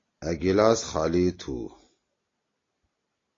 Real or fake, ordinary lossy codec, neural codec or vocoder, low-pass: real; AAC, 32 kbps; none; 7.2 kHz